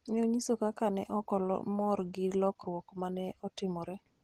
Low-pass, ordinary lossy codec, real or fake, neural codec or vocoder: 14.4 kHz; Opus, 16 kbps; real; none